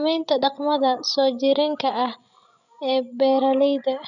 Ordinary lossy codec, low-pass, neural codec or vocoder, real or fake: none; 7.2 kHz; codec, 16 kHz, 16 kbps, FreqCodec, larger model; fake